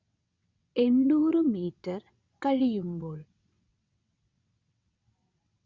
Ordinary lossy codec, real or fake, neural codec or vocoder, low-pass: Opus, 64 kbps; fake; vocoder, 22.05 kHz, 80 mel bands, WaveNeXt; 7.2 kHz